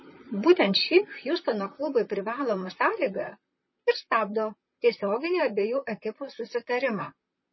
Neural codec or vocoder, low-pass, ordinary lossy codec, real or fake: vocoder, 44.1 kHz, 128 mel bands, Pupu-Vocoder; 7.2 kHz; MP3, 24 kbps; fake